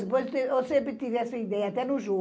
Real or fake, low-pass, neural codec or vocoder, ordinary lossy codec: real; none; none; none